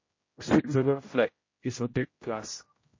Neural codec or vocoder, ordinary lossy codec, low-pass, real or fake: codec, 16 kHz, 0.5 kbps, X-Codec, HuBERT features, trained on general audio; MP3, 32 kbps; 7.2 kHz; fake